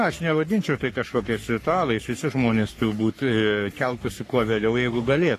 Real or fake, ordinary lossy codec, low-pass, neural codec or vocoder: fake; AAC, 48 kbps; 14.4 kHz; codec, 44.1 kHz, 3.4 kbps, Pupu-Codec